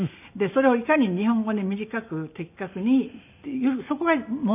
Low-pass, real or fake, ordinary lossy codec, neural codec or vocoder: 3.6 kHz; real; none; none